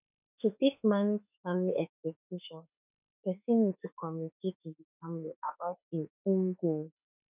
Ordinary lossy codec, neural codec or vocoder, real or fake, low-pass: none; autoencoder, 48 kHz, 32 numbers a frame, DAC-VAE, trained on Japanese speech; fake; 3.6 kHz